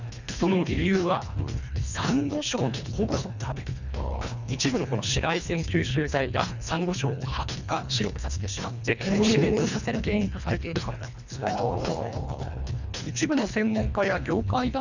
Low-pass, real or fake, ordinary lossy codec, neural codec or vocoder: 7.2 kHz; fake; none; codec, 24 kHz, 1.5 kbps, HILCodec